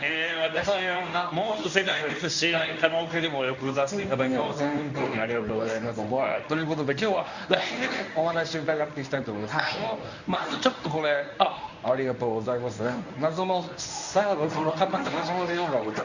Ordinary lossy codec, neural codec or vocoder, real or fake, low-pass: none; codec, 24 kHz, 0.9 kbps, WavTokenizer, medium speech release version 1; fake; 7.2 kHz